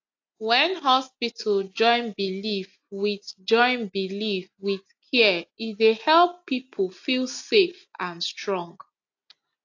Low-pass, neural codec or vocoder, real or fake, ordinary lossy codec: 7.2 kHz; none; real; AAC, 48 kbps